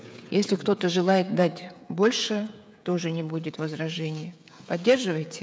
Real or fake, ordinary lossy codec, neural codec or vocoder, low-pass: fake; none; codec, 16 kHz, 16 kbps, FreqCodec, smaller model; none